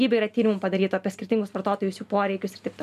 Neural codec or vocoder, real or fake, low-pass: none; real; 14.4 kHz